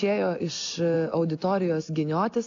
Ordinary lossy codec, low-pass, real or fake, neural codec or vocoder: AAC, 48 kbps; 7.2 kHz; real; none